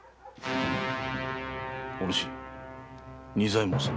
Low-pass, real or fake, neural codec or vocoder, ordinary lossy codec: none; real; none; none